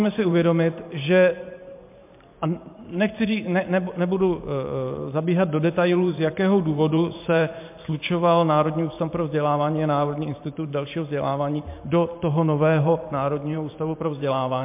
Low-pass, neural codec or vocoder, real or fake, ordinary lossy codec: 3.6 kHz; vocoder, 44.1 kHz, 128 mel bands every 512 samples, BigVGAN v2; fake; MP3, 32 kbps